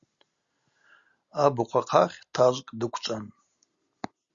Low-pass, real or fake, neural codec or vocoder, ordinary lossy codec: 7.2 kHz; real; none; Opus, 64 kbps